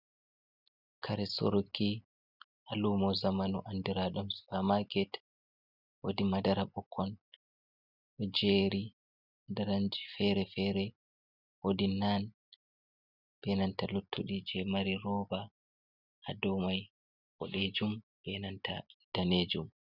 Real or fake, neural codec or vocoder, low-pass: real; none; 5.4 kHz